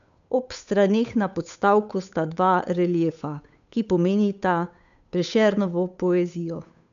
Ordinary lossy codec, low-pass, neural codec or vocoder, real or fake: none; 7.2 kHz; codec, 16 kHz, 8 kbps, FunCodec, trained on Chinese and English, 25 frames a second; fake